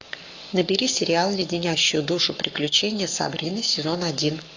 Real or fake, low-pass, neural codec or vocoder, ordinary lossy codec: fake; 7.2 kHz; codec, 44.1 kHz, 7.8 kbps, Pupu-Codec; AAC, 48 kbps